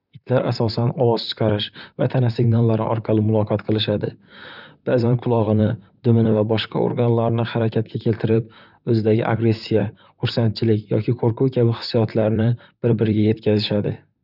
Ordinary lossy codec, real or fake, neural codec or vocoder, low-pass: none; fake; vocoder, 44.1 kHz, 128 mel bands, Pupu-Vocoder; 5.4 kHz